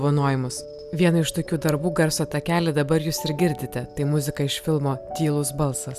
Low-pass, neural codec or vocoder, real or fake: 14.4 kHz; none; real